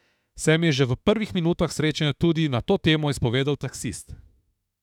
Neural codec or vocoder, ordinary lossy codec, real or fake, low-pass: autoencoder, 48 kHz, 32 numbers a frame, DAC-VAE, trained on Japanese speech; none; fake; 19.8 kHz